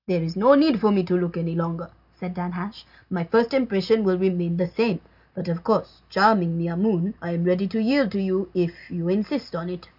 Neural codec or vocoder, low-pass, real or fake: none; 5.4 kHz; real